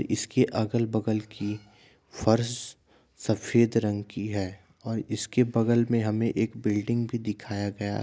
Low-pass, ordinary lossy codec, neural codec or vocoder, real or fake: none; none; none; real